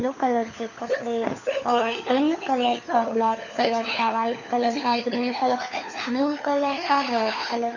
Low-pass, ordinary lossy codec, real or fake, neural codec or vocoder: 7.2 kHz; none; fake; codec, 16 kHz, 4 kbps, FunCodec, trained on LibriTTS, 50 frames a second